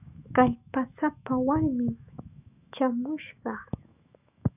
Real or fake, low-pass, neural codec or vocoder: fake; 3.6 kHz; autoencoder, 48 kHz, 128 numbers a frame, DAC-VAE, trained on Japanese speech